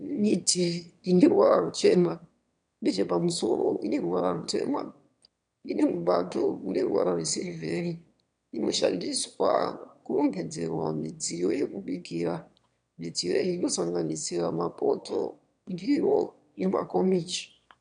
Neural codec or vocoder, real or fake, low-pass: autoencoder, 22.05 kHz, a latent of 192 numbers a frame, VITS, trained on one speaker; fake; 9.9 kHz